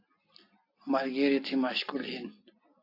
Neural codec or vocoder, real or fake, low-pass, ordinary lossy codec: none; real; 5.4 kHz; AAC, 32 kbps